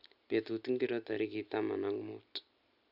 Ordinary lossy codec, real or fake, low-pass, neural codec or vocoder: none; real; 5.4 kHz; none